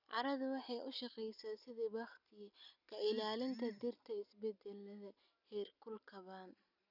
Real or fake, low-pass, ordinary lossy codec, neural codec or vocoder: real; 5.4 kHz; none; none